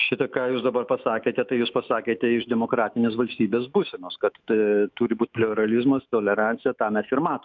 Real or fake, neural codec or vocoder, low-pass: fake; autoencoder, 48 kHz, 128 numbers a frame, DAC-VAE, trained on Japanese speech; 7.2 kHz